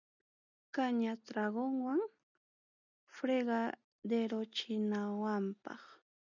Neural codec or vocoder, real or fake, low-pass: none; real; 7.2 kHz